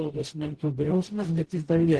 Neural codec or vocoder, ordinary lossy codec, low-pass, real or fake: codec, 44.1 kHz, 0.9 kbps, DAC; Opus, 16 kbps; 10.8 kHz; fake